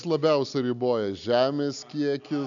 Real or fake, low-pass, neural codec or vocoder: real; 7.2 kHz; none